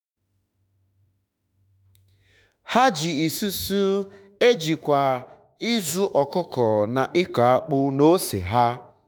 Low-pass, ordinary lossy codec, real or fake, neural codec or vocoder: none; none; fake; autoencoder, 48 kHz, 32 numbers a frame, DAC-VAE, trained on Japanese speech